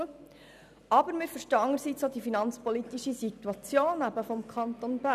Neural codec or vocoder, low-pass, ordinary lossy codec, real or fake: none; 14.4 kHz; none; real